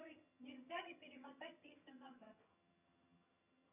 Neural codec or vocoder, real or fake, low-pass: vocoder, 22.05 kHz, 80 mel bands, HiFi-GAN; fake; 3.6 kHz